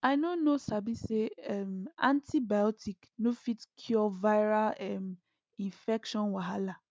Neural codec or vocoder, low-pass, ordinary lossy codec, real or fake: none; none; none; real